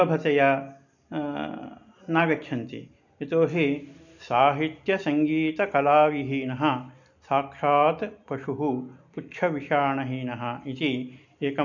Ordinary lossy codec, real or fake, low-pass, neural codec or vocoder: none; real; 7.2 kHz; none